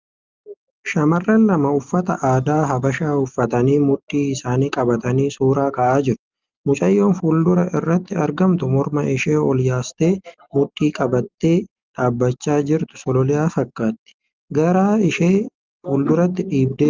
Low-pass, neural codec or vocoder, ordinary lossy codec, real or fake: 7.2 kHz; none; Opus, 32 kbps; real